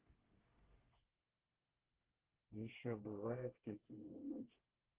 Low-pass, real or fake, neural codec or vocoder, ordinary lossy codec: 3.6 kHz; fake; codec, 24 kHz, 1 kbps, SNAC; Opus, 16 kbps